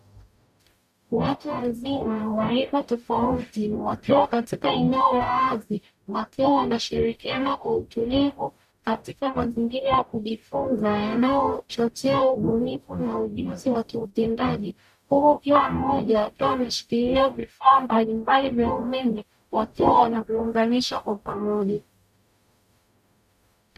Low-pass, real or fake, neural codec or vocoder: 14.4 kHz; fake; codec, 44.1 kHz, 0.9 kbps, DAC